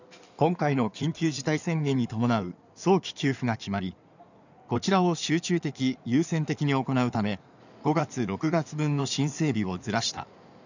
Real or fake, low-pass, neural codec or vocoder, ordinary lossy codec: fake; 7.2 kHz; codec, 16 kHz in and 24 kHz out, 2.2 kbps, FireRedTTS-2 codec; none